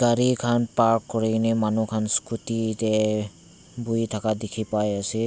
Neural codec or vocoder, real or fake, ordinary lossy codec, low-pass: none; real; none; none